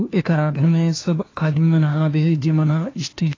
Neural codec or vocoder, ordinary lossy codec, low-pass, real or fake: codec, 16 kHz, 1 kbps, FunCodec, trained on LibriTTS, 50 frames a second; AAC, 32 kbps; 7.2 kHz; fake